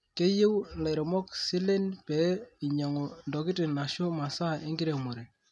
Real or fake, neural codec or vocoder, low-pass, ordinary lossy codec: real; none; none; none